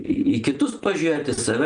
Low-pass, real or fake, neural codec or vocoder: 9.9 kHz; real; none